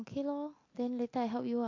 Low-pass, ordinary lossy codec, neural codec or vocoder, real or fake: 7.2 kHz; AAC, 32 kbps; none; real